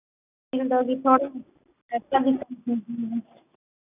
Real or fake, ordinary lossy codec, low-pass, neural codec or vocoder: real; none; 3.6 kHz; none